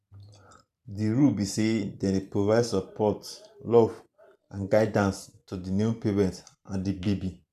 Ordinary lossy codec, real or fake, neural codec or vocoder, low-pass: none; fake; vocoder, 44.1 kHz, 128 mel bands every 512 samples, BigVGAN v2; 14.4 kHz